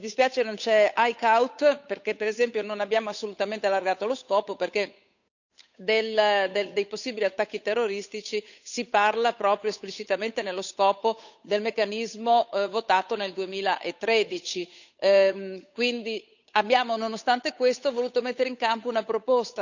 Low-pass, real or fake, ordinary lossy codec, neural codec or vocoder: 7.2 kHz; fake; none; codec, 16 kHz, 8 kbps, FunCodec, trained on Chinese and English, 25 frames a second